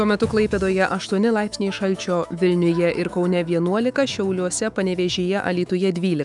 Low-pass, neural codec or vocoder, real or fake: 10.8 kHz; autoencoder, 48 kHz, 128 numbers a frame, DAC-VAE, trained on Japanese speech; fake